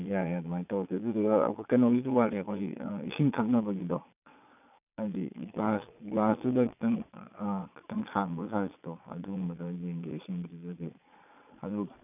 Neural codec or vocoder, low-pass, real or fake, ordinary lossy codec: vocoder, 22.05 kHz, 80 mel bands, Vocos; 3.6 kHz; fake; none